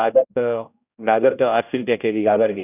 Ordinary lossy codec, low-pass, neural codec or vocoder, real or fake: none; 3.6 kHz; codec, 16 kHz, 0.5 kbps, X-Codec, HuBERT features, trained on general audio; fake